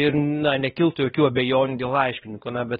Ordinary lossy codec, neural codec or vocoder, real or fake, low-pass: AAC, 16 kbps; codec, 16 kHz, 2 kbps, X-Codec, WavLM features, trained on Multilingual LibriSpeech; fake; 7.2 kHz